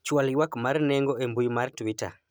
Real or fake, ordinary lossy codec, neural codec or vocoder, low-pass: real; none; none; none